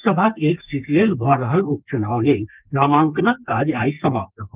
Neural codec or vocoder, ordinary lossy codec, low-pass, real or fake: codec, 32 kHz, 1.9 kbps, SNAC; Opus, 24 kbps; 3.6 kHz; fake